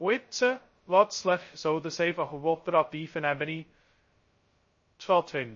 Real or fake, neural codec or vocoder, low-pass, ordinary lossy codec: fake; codec, 16 kHz, 0.2 kbps, FocalCodec; 7.2 kHz; MP3, 32 kbps